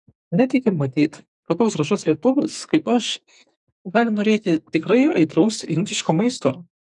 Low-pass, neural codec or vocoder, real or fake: 10.8 kHz; codec, 44.1 kHz, 2.6 kbps, SNAC; fake